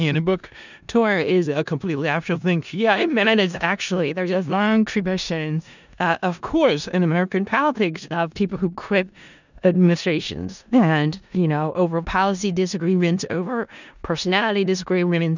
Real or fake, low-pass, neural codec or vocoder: fake; 7.2 kHz; codec, 16 kHz in and 24 kHz out, 0.4 kbps, LongCat-Audio-Codec, four codebook decoder